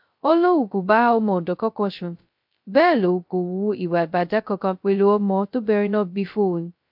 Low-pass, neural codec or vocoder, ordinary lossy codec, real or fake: 5.4 kHz; codec, 16 kHz, 0.2 kbps, FocalCodec; MP3, 48 kbps; fake